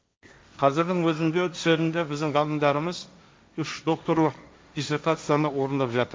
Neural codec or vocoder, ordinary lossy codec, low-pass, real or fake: codec, 16 kHz, 1.1 kbps, Voila-Tokenizer; none; none; fake